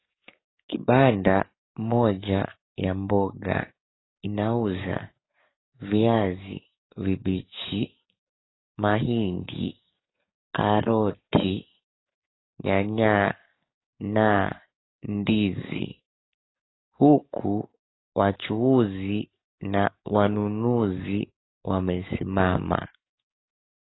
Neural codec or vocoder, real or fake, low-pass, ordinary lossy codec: codec, 44.1 kHz, 7.8 kbps, DAC; fake; 7.2 kHz; AAC, 16 kbps